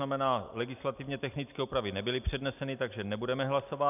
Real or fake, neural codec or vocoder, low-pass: real; none; 3.6 kHz